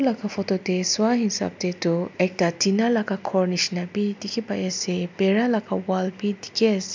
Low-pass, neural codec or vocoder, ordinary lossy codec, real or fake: 7.2 kHz; none; MP3, 64 kbps; real